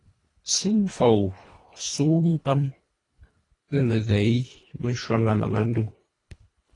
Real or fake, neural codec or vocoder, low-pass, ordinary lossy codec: fake; codec, 24 kHz, 1.5 kbps, HILCodec; 10.8 kHz; AAC, 32 kbps